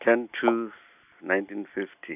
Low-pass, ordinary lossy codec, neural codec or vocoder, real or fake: 3.6 kHz; none; none; real